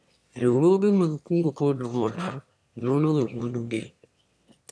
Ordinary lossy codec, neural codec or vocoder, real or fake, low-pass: none; autoencoder, 22.05 kHz, a latent of 192 numbers a frame, VITS, trained on one speaker; fake; none